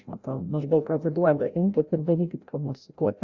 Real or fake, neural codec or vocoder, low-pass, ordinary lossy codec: fake; codec, 16 kHz, 0.5 kbps, FreqCodec, larger model; 7.2 kHz; Opus, 64 kbps